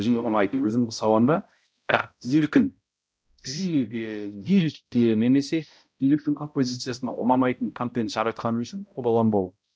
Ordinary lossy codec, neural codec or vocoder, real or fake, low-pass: none; codec, 16 kHz, 0.5 kbps, X-Codec, HuBERT features, trained on balanced general audio; fake; none